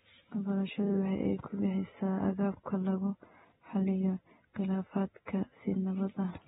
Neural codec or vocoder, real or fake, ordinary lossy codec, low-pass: none; real; AAC, 16 kbps; 7.2 kHz